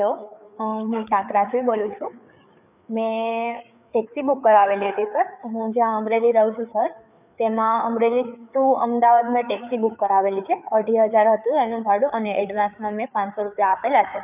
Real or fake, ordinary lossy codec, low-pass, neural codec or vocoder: fake; none; 3.6 kHz; codec, 16 kHz, 4 kbps, FreqCodec, larger model